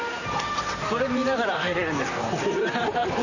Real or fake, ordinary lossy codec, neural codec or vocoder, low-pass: fake; none; vocoder, 44.1 kHz, 128 mel bands, Pupu-Vocoder; 7.2 kHz